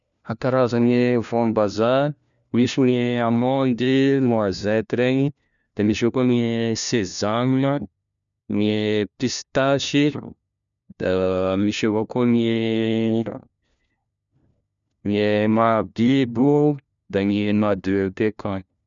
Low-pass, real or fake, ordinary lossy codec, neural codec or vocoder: 7.2 kHz; fake; none; codec, 16 kHz, 1 kbps, FunCodec, trained on LibriTTS, 50 frames a second